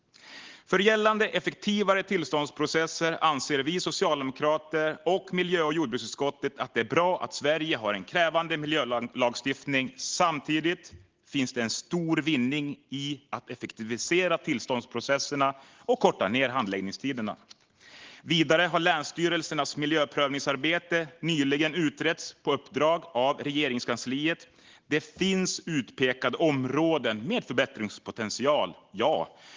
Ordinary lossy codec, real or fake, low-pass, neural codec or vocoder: Opus, 16 kbps; real; 7.2 kHz; none